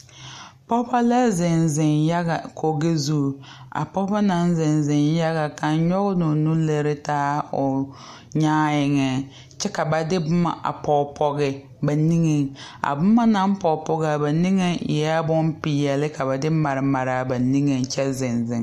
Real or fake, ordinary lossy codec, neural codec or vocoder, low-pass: real; MP3, 64 kbps; none; 14.4 kHz